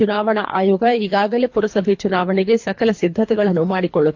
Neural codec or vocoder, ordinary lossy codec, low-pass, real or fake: codec, 24 kHz, 3 kbps, HILCodec; AAC, 48 kbps; 7.2 kHz; fake